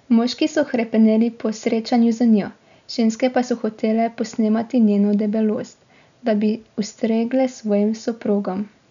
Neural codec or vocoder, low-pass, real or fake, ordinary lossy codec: none; 7.2 kHz; real; none